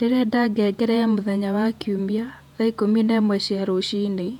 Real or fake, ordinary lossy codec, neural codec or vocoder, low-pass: fake; none; vocoder, 48 kHz, 128 mel bands, Vocos; 19.8 kHz